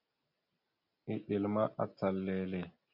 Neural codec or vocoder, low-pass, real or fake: none; 5.4 kHz; real